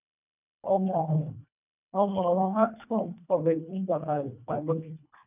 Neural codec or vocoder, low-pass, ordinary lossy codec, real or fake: codec, 24 kHz, 1.5 kbps, HILCodec; 3.6 kHz; none; fake